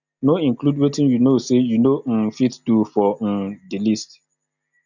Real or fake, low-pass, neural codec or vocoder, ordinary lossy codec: real; 7.2 kHz; none; none